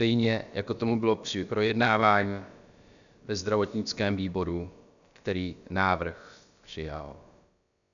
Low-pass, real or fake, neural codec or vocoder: 7.2 kHz; fake; codec, 16 kHz, about 1 kbps, DyCAST, with the encoder's durations